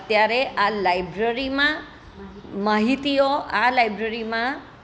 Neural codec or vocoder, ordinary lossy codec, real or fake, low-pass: none; none; real; none